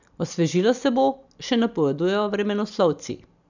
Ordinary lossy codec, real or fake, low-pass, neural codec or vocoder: none; fake; 7.2 kHz; vocoder, 44.1 kHz, 128 mel bands every 256 samples, BigVGAN v2